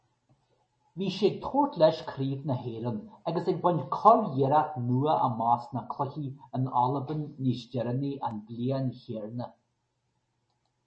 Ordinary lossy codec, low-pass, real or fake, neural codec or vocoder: MP3, 32 kbps; 9.9 kHz; real; none